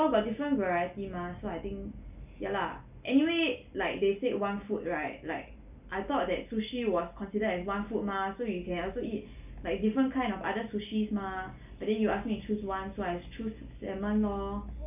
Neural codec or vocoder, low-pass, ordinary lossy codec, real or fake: none; 3.6 kHz; none; real